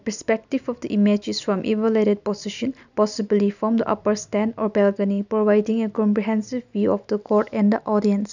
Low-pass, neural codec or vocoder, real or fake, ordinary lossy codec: 7.2 kHz; none; real; none